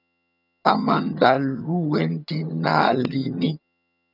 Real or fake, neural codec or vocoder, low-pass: fake; vocoder, 22.05 kHz, 80 mel bands, HiFi-GAN; 5.4 kHz